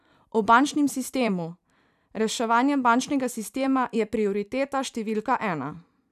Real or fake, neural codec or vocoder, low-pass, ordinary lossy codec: fake; vocoder, 44.1 kHz, 128 mel bands every 256 samples, BigVGAN v2; 14.4 kHz; none